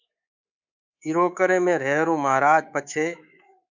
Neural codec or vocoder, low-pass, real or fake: codec, 24 kHz, 3.1 kbps, DualCodec; 7.2 kHz; fake